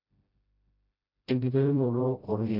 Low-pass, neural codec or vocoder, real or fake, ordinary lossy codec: 5.4 kHz; codec, 16 kHz, 0.5 kbps, FreqCodec, smaller model; fake; MP3, 48 kbps